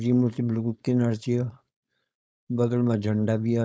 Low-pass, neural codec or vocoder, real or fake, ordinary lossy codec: none; codec, 16 kHz, 4.8 kbps, FACodec; fake; none